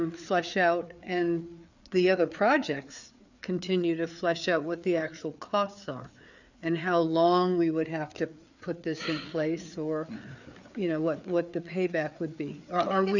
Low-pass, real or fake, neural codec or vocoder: 7.2 kHz; fake; codec, 16 kHz, 4 kbps, FreqCodec, larger model